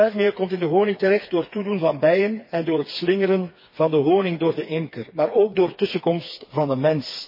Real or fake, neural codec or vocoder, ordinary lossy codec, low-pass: fake; codec, 16 kHz, 4 kbps, FreqCodec, smaller model; MP3, 24 kbps; 5.4 kHz